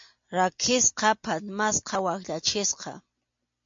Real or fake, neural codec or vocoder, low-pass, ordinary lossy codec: real; none; 7.2 kHz; MP3, 48 kbps